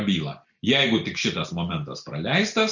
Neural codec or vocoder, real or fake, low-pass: none; real; 7.2 kHz